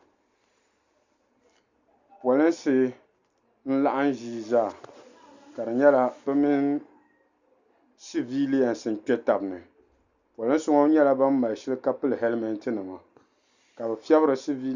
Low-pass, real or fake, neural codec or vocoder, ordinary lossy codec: 7.2 kHz; real; none; Opus, 64 kbps